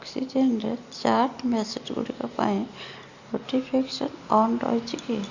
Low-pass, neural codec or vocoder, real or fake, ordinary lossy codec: 7.2 kHz; none; real; Opus, 64 kbps